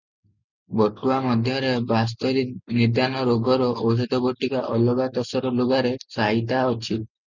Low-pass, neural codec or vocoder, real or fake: 7.2 kHz; none; real